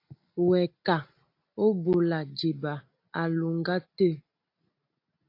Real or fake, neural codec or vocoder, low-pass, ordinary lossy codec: real; none; 5.4 kHz; AAC, 32 kbps